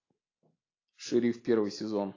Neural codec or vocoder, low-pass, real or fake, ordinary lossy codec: none; 7.2 kHz; real; AAC, 32 kbps